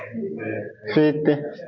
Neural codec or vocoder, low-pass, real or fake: none; 7.2 kHz; real